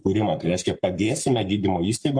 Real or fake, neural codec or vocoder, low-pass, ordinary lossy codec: fake; codec, 44.1 kHz, 7.8 kbps, Pupu-Codec; 9.9 kHz; MP3, 64 kbps